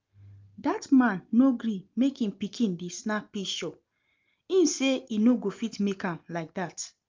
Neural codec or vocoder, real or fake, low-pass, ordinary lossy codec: none; real; 7.2 kHz; Opus, 32 kbps